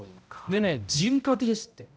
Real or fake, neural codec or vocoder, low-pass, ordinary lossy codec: fake; codec, 16 kHz, 0.5 kbps, X-Codec, HuBERT features, trained on balanced general audio; none; none